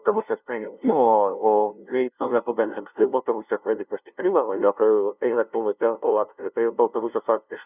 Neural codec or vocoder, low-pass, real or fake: codec, 16 kHz, 0.5 kbps, FunCodec, trained on LibriTTS, 25 frames a second; 3.6 kHz; fake